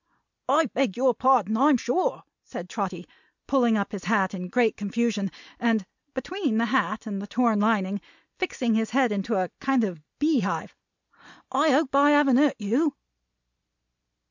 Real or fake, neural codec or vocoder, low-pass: real; none; 7.2 kHz